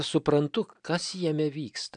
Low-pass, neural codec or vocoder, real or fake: 9.9 kHz; none; real